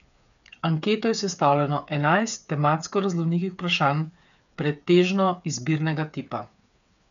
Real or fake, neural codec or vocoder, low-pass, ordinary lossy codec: fake; codec, 16 kHz, 8 kbps, FreqCodec, smaller model; 7.2 kHz; none